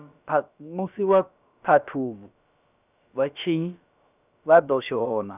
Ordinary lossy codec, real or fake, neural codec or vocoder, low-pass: none; fake; codec, 16 kHz, about 1 kbps, DyCAST, with the encoder's durations; 3.6 kHz